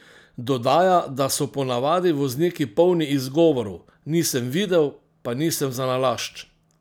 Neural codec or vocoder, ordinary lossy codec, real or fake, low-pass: none; none; real; none